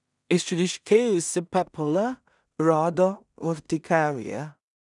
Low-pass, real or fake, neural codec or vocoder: 10.8 kHz; fake; codec, 16 kHz in and 24 kHz out, 0.4 kbps, LongCat-Audio-Codec, two codebook decoder